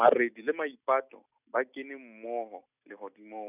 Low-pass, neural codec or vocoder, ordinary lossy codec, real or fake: 3.6 kHz; none; none; real